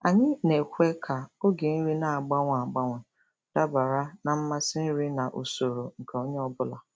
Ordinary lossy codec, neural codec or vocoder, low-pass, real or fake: none; none; none; real